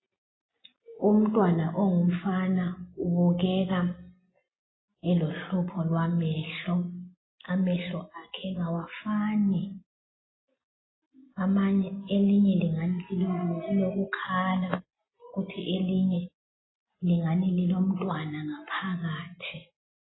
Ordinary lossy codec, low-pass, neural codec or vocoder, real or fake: AAC, 16 kbps; 7.2 kHz; none; real